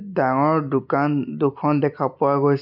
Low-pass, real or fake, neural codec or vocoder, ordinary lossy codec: 5.4 kHz; real; none; none